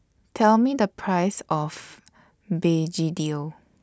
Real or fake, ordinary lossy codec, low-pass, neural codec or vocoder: real; none; none; none